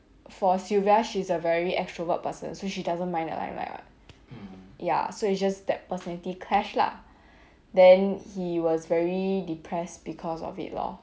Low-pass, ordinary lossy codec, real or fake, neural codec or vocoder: none; none; real; none